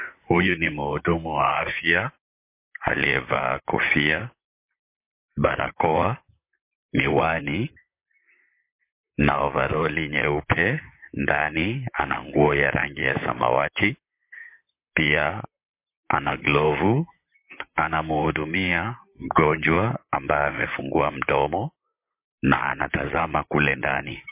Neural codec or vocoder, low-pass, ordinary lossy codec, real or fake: vocoder, 44.1 kHz, 128 mel bands, Pupu-Vocoder; 3.6 kHz; MP3, 24 kbps; fake